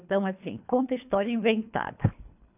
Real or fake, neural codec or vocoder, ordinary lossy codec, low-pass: fake; codec, 24 kHz, 3 kbps, HILCodec; none; 3.6 kHz